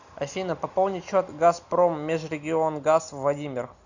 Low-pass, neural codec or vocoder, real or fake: 7.2 kHz; none; real